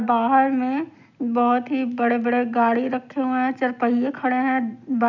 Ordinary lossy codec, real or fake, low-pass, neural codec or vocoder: none; real; 7.2 kHz; none